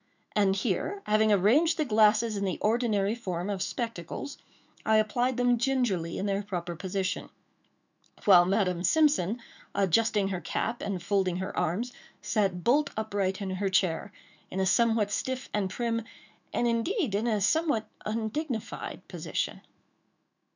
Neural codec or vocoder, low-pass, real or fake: autoencoder, 48 kHz, 128 numbers a frame, DAC-VAE, trained on Japanese speech; 7.2 kHz; fake